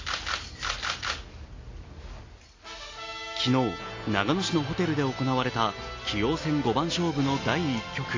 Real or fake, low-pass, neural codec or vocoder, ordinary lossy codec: real; 7.2 kHz; none; AAC, 32 kbps